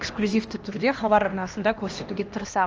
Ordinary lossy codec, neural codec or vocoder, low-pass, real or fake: Opus, 24 kbps; codec, 16 kHz, 1 kbps, X-Codec, HuBERT features, trained on LibriSpeech; 7.2 kHz; fake